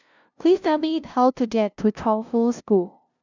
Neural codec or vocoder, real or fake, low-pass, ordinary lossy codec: codec, 16 kHz, 0.5 kbps, FunCodec, trained on LibriTTS, 25 frames a second; fake; 7.2 kHz; none